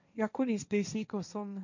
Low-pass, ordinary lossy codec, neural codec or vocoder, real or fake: 7.2 kHz; none; codec, 16 kHz, 1.1 kbps, Voila-Tokenizer; fake